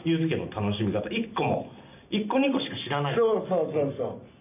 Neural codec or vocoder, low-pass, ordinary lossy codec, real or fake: none; 3.6 kHz; none; real